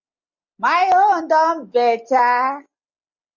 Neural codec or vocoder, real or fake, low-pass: none; real; 7.2 kHz